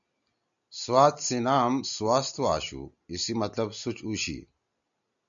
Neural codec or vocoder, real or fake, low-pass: none; real; 7.2 kHz